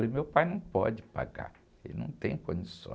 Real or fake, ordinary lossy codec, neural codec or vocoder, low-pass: real; none; none; none